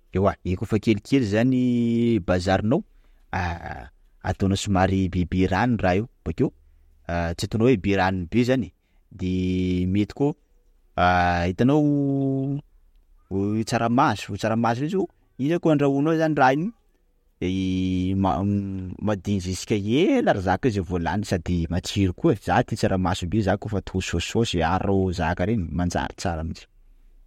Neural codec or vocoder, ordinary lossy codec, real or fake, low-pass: none; MP3, 64 kbps; real; 19.8 kHz